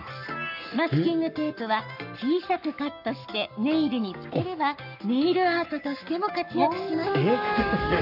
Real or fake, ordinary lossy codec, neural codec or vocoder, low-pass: fake; none; codec, 44.1 kHz, 7.8 kbps, Pupu-Codec; 5.4 kHz